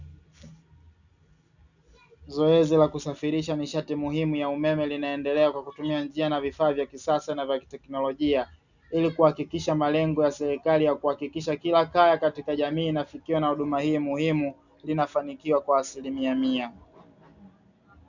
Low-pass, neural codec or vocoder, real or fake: 7.2 kHz; none; real